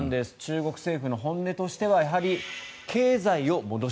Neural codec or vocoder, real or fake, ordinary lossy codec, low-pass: none; real; none; none